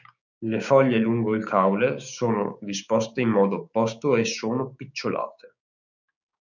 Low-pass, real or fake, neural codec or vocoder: 7.2 kHz; fake; codec, 16 kHz, 6 kbps, DAC